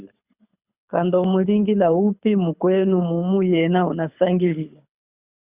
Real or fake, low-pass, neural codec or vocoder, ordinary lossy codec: fake; 3.6 kHz; codec, 24 kHz, 6 kbps, HILCodec; Opus, 64 kbps